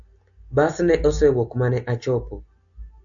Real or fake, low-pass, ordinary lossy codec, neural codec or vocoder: real; 7.2 kHz; AAC, 64 kbps; none